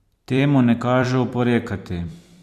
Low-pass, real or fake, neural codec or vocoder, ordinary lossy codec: 14.4 kHz; fake; vocoder, 44.1 kHz, 128 mel bands every 256 samples, BigVGAN v2; Opus, 64 kbps